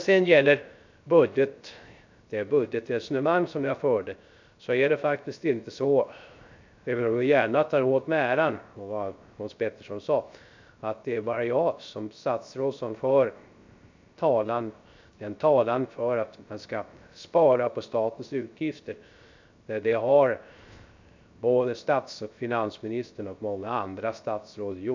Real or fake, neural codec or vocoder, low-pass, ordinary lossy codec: fake; codec, 16 kHz, 0.3 kbps, FocalCodec; 7.2 kHz; MP3, 64 kbps